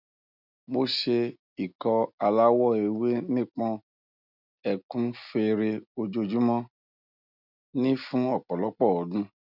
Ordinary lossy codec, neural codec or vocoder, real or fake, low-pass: none; none; real; 5.4 kHz